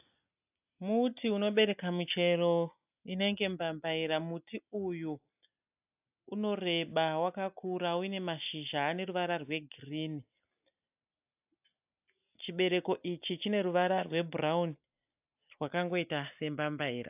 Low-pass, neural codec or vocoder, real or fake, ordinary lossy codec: 3.6 kHz; none; real; AAC, 32 kbps